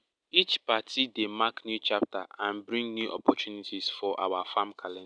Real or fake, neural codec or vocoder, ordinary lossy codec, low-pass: real; none; none; 9.9 kHz